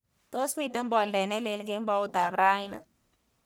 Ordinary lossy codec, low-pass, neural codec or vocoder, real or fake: none; none; codec, 44.1 kHz, 1.7 kbps, Pupu-Codec; fake